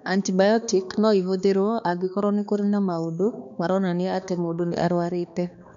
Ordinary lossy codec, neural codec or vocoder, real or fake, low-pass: none; codec, 16 kHz, 2 kbps, X-Codec, HuBERT features, trained on balanced general audio; fake; 7.2 kHz